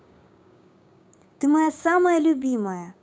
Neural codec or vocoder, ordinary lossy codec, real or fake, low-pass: codec, 16 kHz, 6 kbps, DAC; none; fake; none